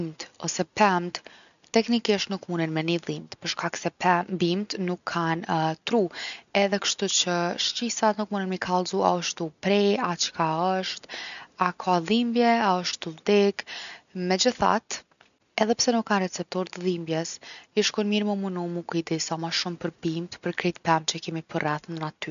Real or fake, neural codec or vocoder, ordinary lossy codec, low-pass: real; none; none; 7.2 kHz